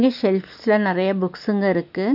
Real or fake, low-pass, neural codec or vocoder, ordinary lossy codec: real; 5.4 kHz; none; none